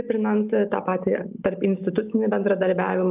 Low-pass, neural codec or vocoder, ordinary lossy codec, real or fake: 3.6 kHz; none; Opus, 24 kbps; real